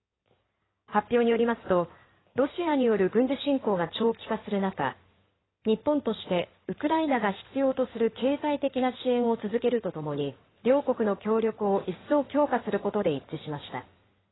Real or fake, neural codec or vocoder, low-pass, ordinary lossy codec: fake; codec, 16 kHz in and 24 kHz out, 2.2 kbps, FireRedTTS-2 codec; 7.2 kHz; AAC, 16 kbps